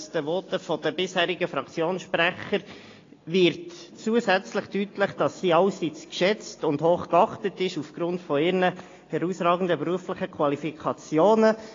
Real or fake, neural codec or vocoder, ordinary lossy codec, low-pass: real; none; AAC, 32 kbps; 7.2 kHz